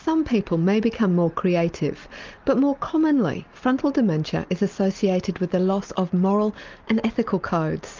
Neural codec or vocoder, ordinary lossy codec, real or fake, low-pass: none; Opus, 24 kbps; real; 7.2 kHz